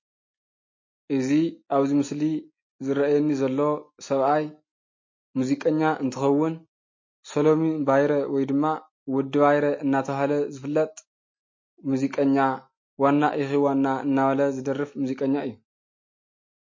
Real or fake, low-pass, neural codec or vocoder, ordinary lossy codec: real; 7.2 kHz; none; MP3, 32 kbps